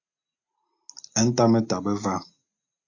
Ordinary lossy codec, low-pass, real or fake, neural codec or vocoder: AAC, 48 kbps; 7.2 kHz; real; none